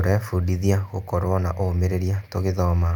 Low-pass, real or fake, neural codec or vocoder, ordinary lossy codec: 19.8 kHz; real; none; none